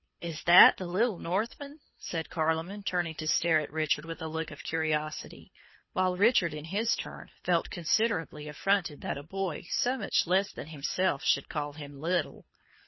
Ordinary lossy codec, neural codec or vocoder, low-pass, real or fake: MP3, 24 kbps; codec, 24 kHz, 6 kbps, HILCodec; 7.2 kHz; fake